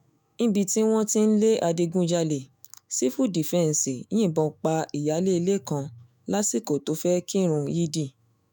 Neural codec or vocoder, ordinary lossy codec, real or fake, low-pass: autoencoder, 48 kHz, 128 numbers a frame, DAC-VAE, trained on Japanese speech; none; fake; none